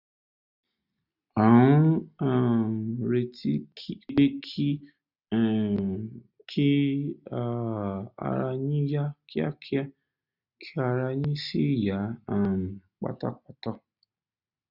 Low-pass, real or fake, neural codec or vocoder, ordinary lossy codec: 5.4 kHz; real; none; none